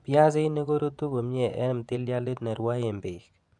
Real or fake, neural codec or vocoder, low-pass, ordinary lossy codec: fake; vocoder, 24 kHz, 100 mel bands, Vocos; 10.8 kHz; none